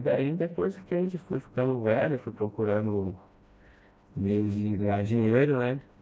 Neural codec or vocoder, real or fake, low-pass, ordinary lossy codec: codec, 16 kHz, 1 kbps, FreqCodec, smaller model; fake; none; none